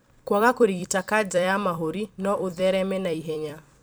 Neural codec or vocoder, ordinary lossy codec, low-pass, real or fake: vocoder, 44.1 kHz, 128 mel bands every 256 samples, BigVGAN v2; none; none; fake